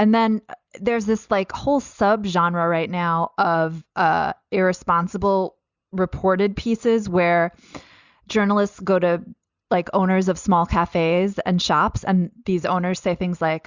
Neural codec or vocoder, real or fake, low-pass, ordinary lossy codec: none; real; 7.2 kHz; Opus, 64 kbps